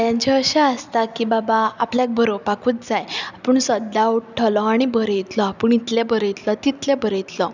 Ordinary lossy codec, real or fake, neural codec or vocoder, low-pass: none; real; none; 7.2 kHz